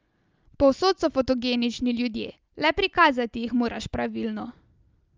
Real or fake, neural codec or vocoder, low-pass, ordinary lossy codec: real; none; 7.2 kHz; Opus, 24 kbps